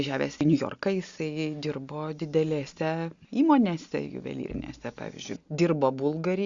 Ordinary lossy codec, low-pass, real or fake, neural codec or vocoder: Opus, 64 kbps; 7.2 kHz; real; none